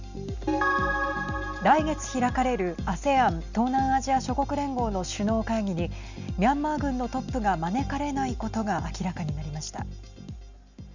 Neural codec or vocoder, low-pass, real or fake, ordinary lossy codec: none; 7.2 kHz; real; none